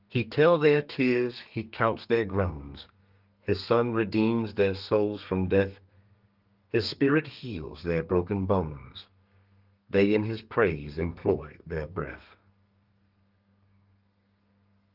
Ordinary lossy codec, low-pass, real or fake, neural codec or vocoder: Opus, 24 kbps; 5.4 kHz; fake; codec, 32 kHz, 1.9 kbps, SNAC